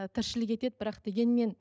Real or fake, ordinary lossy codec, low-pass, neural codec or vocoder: real; none; none; none